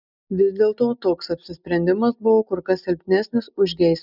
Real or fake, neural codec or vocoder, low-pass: real; none; 5.4 kHz